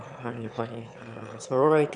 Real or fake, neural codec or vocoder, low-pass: fake; autoencoder, 22.05 kHz, a latent of 192 numbers a frame, VITS, trained on one speaker; 9.9 kHz